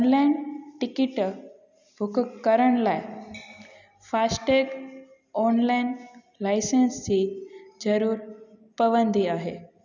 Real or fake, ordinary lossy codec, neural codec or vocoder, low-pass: real; none; none; 7.2 kHz